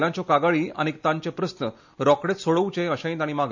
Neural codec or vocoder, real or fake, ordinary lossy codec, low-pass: none; real; MP3, 48 kbps; 7.2 kHz